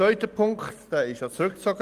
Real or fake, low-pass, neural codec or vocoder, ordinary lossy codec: real; 14.4 kHz; none; Opus, 32 kbps